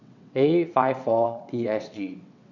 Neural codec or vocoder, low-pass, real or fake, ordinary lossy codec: vocoder, 22.05 kHz, 80 mel bands, WaveNeXt; 7.2 kHz; fake; none